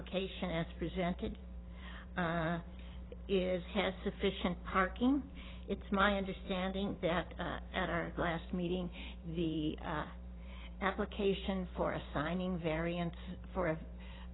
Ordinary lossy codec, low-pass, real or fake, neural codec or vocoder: AAC, 16 kbps; 7.2 kHz; fake; vocoder, 44.1 kHz, 128 mel bands every 512 samples, BigVGAN v2